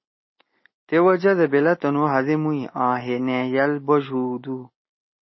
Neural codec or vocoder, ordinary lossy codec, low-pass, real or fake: none; MP3, 24 kbps; 7.2 kHz; real